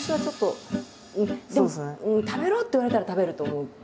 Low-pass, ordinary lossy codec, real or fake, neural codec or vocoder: none; none; real; none